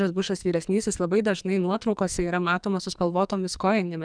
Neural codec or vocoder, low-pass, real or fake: codec, 44.1 kHz, 2.6 kbps, SNAC; 9.9 kHz; fake